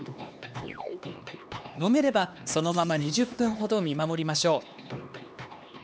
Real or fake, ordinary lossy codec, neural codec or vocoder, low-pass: fake; none; codec, 16 kHz, 2 kbps, X-Codec, HuBERT features, trained on LibriSpeech; none